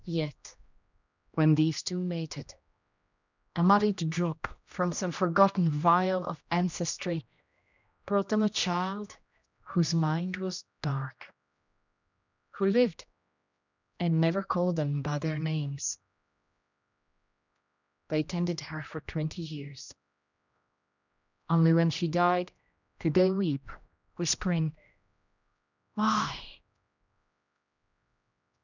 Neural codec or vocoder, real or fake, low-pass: codec, 16 kHz, 1 kbps, X-Codec, HuBERT features, trained on general audio; fake; 7.2 kHz